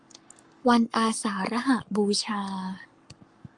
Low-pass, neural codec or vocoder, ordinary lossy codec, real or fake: 10.8 kHz; codec, 44.1 kHz, 7.8 kbps, Pupu-Codec; Opus, 32 kbps; fake